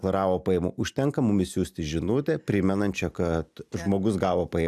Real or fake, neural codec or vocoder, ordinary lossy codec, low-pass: real; none; AAC, 96 kbps; 14.4 kHz